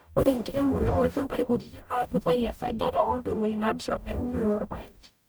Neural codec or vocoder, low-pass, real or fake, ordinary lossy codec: codec, 44.1 kHz, 0.9 kbps, DAC; none; fake; none